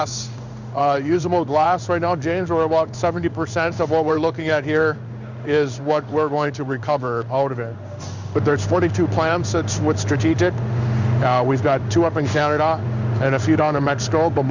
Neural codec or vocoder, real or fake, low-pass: codec, 16 kHz in and 24 kHz out, 1 kbps, XY-Tokenizer; fake; 7.2 kHz